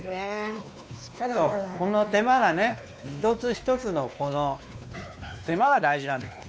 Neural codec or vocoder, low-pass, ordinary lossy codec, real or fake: codec, 16 kHz, 2 kbps, X-Codec, WavLM features, trained on Multilingual LibriSpeech; none; none; fake